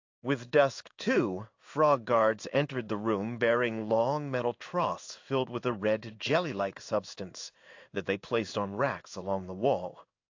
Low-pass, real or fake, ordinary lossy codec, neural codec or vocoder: 7.2 kHz; fake; AAC, 48 kbps; codec, 16 kHz in and 24 kHz out, 1 kbps, XY-Tokenizer